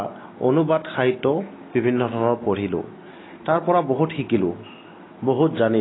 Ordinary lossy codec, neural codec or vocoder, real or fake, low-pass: AAC, 16 kbps; none; real; 7.2 kHz